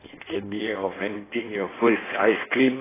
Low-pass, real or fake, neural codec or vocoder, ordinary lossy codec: 3.6 kHz; fake; codec, 16 kHz in and 24 kHz out, 1.1 kbps, FireRedTTS-2 codec; AAC, 16 kbps